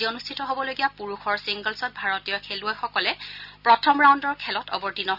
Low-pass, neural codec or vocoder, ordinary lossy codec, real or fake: 5.4 kHz; none; none; real